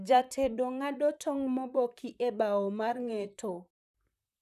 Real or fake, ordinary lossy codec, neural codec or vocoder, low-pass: fake; none; vocoder, 44.1 kHz, 128 mel bands, Pupu-Vocoder; 14.4 kHz